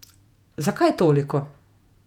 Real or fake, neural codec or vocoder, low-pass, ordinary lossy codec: fake; vocoder, 48 kHz, 128 mel bands, Vocos; 19.8 kHz; none